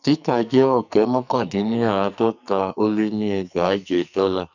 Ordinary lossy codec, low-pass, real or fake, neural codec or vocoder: none; 7.2 kHz; fake; codec, 44.1 kHz, 2.6 kbps, SNAC